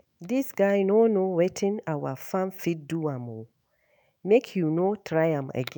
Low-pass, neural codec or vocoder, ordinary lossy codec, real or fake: none; autoencoder, 48 kHz, 128 numbers a frame, DAC-VAE, trained on Japanese speech; none; fake